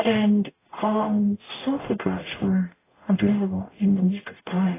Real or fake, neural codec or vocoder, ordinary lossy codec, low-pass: fake; codec, 44.1 kHz, 0.9 kbps, DAC; AAC, 16 kbps; 3.6 kHz